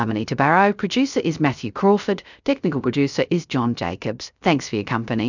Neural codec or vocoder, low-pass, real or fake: codec, 16 kHz, 0.3 kbps, FocalCodec; 7.2 kHz; fake